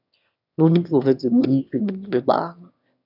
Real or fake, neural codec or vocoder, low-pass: fake; autoencoder, 22.05 kHz, a latent of 192 numbers a frame, VITS, trained on one speaker; 5.4 kHz